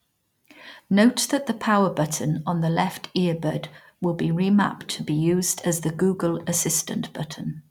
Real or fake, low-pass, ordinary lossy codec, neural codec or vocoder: real; 19.8 kHz; none; none